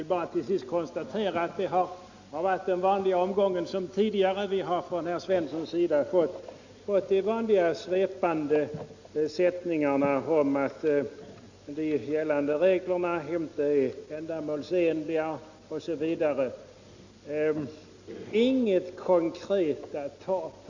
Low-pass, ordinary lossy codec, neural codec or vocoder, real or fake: 7.2 kHz; Opus, 64 kbps; none; real